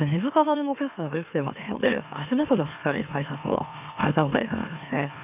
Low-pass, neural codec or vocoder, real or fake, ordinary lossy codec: 3.6 kHz; autoencoder, 44.1 kHz, a latent of 192 numbers a frame, MeloTTS; fake; none